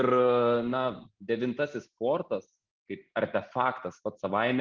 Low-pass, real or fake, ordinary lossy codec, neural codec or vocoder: 7.2 kHz; real; Opus, 16 kbps; none